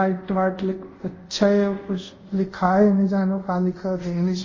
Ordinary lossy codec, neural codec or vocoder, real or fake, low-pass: MP3, 32 kbps; codec, 24 kHz, 0.5 kbps, DualCodec; fake; 7.2 kHz